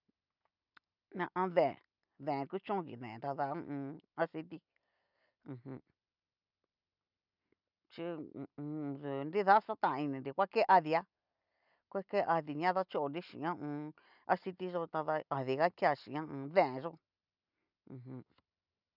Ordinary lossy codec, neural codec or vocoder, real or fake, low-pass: none; none; real; 5.4 kHz